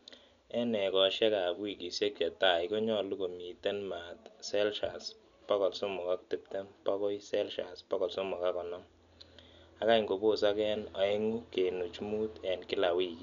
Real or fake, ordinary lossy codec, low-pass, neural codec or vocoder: real; none; 7.2 kHz; none